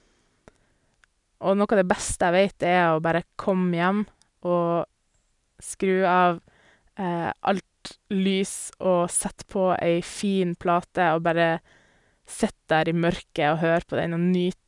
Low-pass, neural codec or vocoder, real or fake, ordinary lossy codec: 10.8 kHz; none; real; none